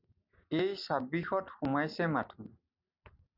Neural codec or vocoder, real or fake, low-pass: none; real; 5.4 kHz